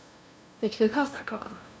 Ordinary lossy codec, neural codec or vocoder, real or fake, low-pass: none; codec, 16 kHz, 0.5 kbps, FunCodec, trained on LibriTTS, 25 frames a second; fake; none